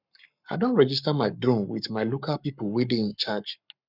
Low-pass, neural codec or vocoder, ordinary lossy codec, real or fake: 5.4 kHz; codec, 44.1 kHz, 7.8 kbps, Pupu-Codec; none; fake